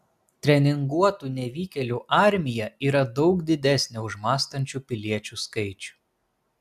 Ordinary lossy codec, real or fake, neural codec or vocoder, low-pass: AAC, 96 kbps; real; none; 14.4 kHz